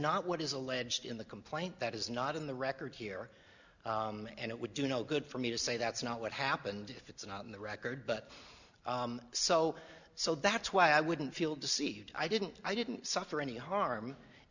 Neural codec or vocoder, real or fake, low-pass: none; real; 7.2 kHz